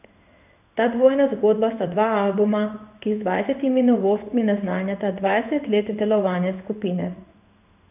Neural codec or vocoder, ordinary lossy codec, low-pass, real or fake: codec, 16 kHz in and 24 kHz out, 1 kbps, XY-Tokenizer; none; 3.6 kHz; fake